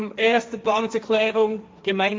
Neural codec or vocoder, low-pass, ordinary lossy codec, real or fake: codec, 16 kHz, 1.1 kbps, Voila-Tokenizer; none; none; fake